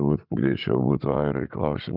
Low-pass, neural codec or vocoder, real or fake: 5.4 kHz; vocoder, 44.1 kHz, 80 mel bands, Vocos; fake